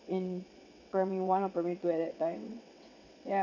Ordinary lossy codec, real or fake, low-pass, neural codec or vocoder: none; fake; 7.2 kHz; vocoder, 22.05 kHz, 80 mel bands, WaveNeXt